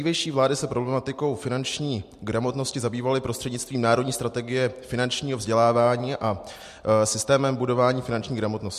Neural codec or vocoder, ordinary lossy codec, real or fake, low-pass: none; MP3, 64 kbps; real; 14.4 kHz